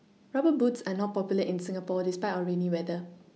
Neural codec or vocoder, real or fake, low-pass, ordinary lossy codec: none; real; none; none